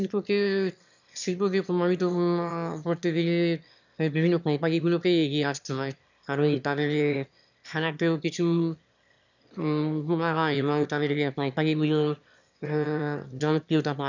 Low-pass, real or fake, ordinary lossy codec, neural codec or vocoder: 7.2 kHz; fake; none; autoencoder, 22.05 kHz, a latent of 192 numbers a frame, VITS, trained on one speaker